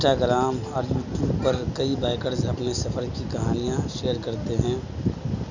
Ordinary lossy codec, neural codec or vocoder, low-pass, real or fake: none; none; 7.2 kHz; real